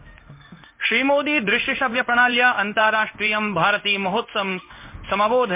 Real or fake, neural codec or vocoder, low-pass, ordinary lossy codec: fake; codec, 16 kHz in and 24 kHz out, 1 kbps, XY-Tokenizer; 3.6 kHz; MP3, 24 kbps